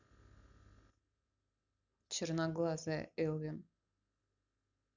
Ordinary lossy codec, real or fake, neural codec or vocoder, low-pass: none; real; none; 7.2 kHz